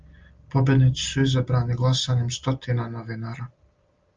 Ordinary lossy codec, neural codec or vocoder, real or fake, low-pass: Opus, 24 kbps; none; real; 7.2 kHz